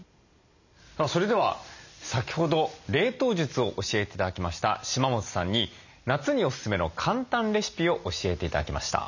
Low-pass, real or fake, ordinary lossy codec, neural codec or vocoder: 7.2 kHz; real; none; none